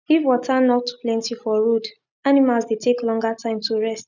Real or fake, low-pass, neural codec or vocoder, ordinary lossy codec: real; 7.2 kHz; none; none